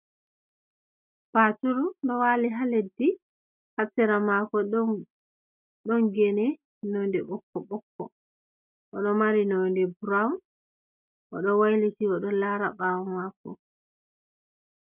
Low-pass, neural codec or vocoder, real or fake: 3.6 kHz; none; real